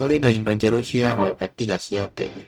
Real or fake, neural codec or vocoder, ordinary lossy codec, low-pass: fake; codec, 44.1 kHz, 0.9 kbps, DAC; none; 19.8 kHz